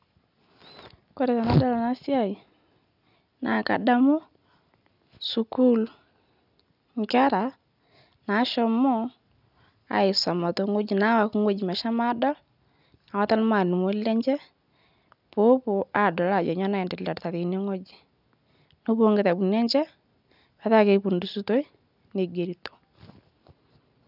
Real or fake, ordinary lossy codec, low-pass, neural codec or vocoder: real; none; 5.4 kHz; none